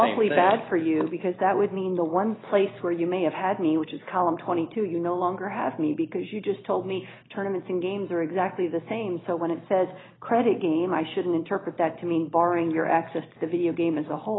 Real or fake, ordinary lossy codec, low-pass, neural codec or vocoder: real; AAC, 16 kbps; 7.2 kHz; none